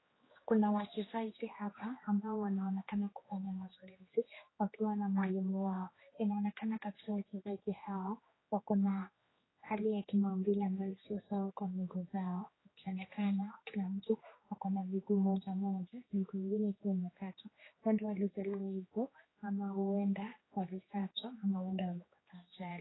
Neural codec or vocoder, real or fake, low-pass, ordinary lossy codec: codec, 16 kHz, 2 kbps, X-Codec, HuBERT features, trained on general audio; fake; 7.2 kHz; AAC, 16 kbps